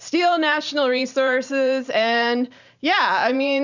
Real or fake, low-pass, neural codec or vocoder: fake; 7.2 kHz; vocoder, 44.1 kHz, 128 mel bands every 512 samples, BigVGAN v2